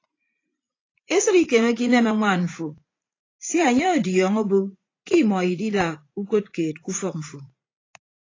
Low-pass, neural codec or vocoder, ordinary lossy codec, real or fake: 7.2 kHz; vocoder, 44.1 kHz, 80 mel bands, Vocos; AAC, 32 kbps; fake